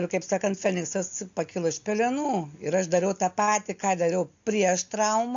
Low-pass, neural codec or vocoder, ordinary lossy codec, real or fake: 7.2 kHz; none; AAC, 64 kbps; real